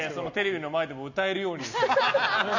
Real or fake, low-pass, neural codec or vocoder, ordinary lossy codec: real; 7.2 kHz; none; none